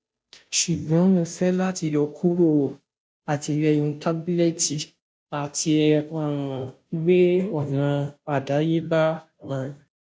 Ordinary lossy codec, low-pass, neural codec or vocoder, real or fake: none; none; codec, 16 kHz, 0.5 kbps, FunCodec, trained on Chinese and English, 25 frames a second; fake